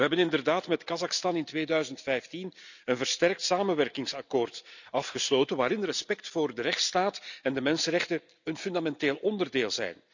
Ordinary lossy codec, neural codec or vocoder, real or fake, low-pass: none; none; real; 7.2 kHz